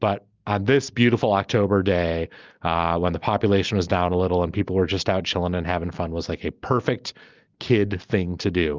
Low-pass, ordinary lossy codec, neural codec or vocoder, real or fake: 7.2 kHz; Opus, 24 kbps; none; real